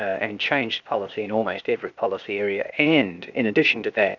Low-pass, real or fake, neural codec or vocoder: 7.2 kHz; fake; codec, 16 kHz, 0.8 kbps, ZipCodec